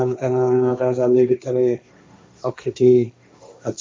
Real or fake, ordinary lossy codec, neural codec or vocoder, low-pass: fake; none; codec, 16 kHz, 1.1 kbps, Voila-Tokenizer; none